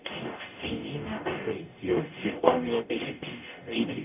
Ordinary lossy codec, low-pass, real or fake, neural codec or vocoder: none; 3.6 kHz; fake; codec, 44.1 kHz, 0.9 kbps, DAC